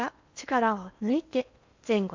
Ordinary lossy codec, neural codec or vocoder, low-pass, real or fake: MP3, 64 kbps; codec, 16 kHz in and 24 kHz out, 0.8 kbps, FocalCodec, streaming, 65536 codes; 7.2 kHz; fake